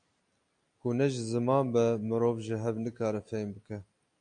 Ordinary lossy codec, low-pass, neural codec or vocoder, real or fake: AAC, 64 kbps; 9.9 kHz; none; real